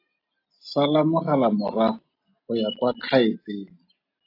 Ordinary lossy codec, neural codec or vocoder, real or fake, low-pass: AAC, 32 kbps; none; real; 5.4 kHz